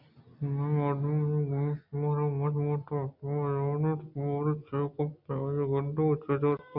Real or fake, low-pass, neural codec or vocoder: real; 5.4 kHz; none